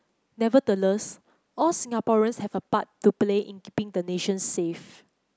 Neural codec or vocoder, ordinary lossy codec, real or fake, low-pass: none; none; real; none